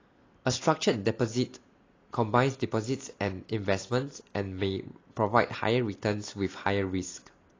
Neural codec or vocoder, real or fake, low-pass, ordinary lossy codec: none; real; 7.2 kHz; AAC, 32 kbps